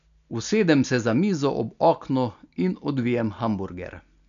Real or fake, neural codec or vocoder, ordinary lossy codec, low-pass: real; none; none; 7.2 kHz